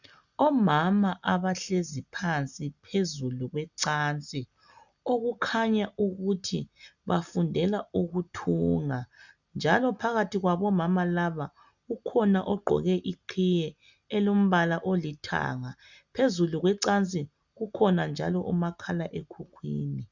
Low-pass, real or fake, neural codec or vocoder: 7.2 kHz; real; none